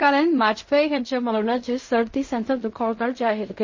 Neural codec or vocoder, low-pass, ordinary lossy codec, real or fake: codec, 16 kHz in and 24 kHz out, 0.4 kbps, LongCat-Audio-Codec, fine tuned four codebook decoder; 7.2 kHz; MP3, 32 kbps; fake